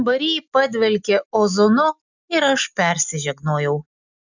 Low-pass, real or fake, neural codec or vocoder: 7.2 kHz; real; none